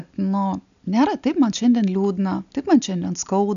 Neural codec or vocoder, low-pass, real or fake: none; 7.2 kHz; real